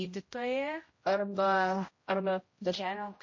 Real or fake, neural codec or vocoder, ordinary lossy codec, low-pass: fake; codec, 16 kHz, 0.5 kbps, X-Codec, HuBERT features, trained on general audio; MP3, 32 kbps; 7.2 kHz